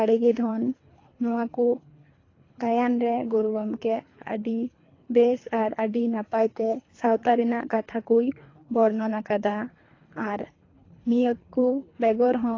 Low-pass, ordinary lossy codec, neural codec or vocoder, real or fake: 7.2 kHz; AAC, 32 kbps; codec, 24 kHz, 3 kbps, HILCodec; fake